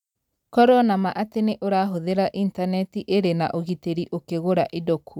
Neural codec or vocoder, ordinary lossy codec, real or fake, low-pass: none; none; real; 19.8 kHz